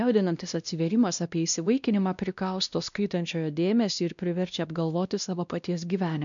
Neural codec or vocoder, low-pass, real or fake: codec, 16 kHz, 1 kbps, X-Codec, WavLM features, trained on Multilingual LibriSpeech; 7.2 kHz; fake